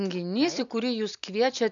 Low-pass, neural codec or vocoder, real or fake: 7.2 kHz; none; real